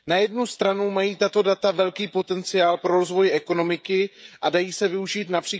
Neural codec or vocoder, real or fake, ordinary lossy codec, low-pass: codec, 16 kHz, 16 kbps, FreqCodec, smaller model; fake; none; none